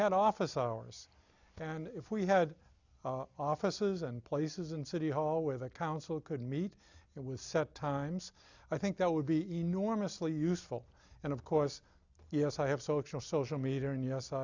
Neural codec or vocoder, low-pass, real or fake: none; 7.2 kHz; real